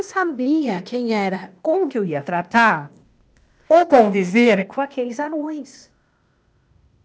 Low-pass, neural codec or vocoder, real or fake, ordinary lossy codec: none; codec, 16 kHz, 0.8 kbps, ZipCodec; fake; none